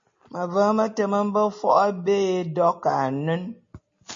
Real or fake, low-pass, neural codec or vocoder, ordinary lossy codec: real; 7.2 kHz; none; MP3, 32 kbps